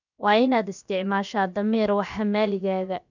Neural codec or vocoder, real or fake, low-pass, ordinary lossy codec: codec, 16 kHz, about 1 kbps, DyCAST, with the encoder's durations; fake; 7.2 kHz; none